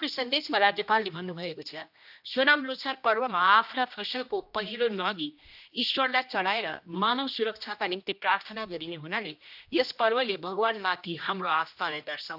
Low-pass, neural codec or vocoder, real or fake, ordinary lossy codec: 5.4 kHz; codec, 16 kHz, 1 kbps, X-Codec, HuBERT features, trained on general audio; fake; AAC, 48 kbps